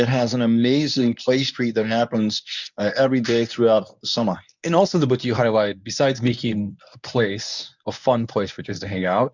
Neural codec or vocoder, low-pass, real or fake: codec, 24 kHz, 0.9 kbps, WavTokenizer, medium speech release version 1; 7.2 kHz; fake